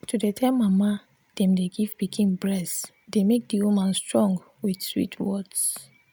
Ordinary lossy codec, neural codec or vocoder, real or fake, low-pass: none; none; real; none